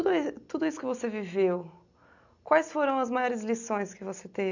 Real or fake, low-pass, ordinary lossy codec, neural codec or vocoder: real; 7.2 kHz; none; none